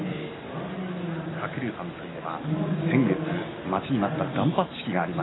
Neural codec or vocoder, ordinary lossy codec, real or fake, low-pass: none; AAC, 16 kbps; real; 7.2 kHz